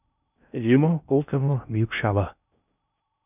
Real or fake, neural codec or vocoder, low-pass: fake; codec, 16 kHz in and 24 kHz out, 0.6 kbps, FocalCodec, streaming, 2048 codes; 3.6 kHz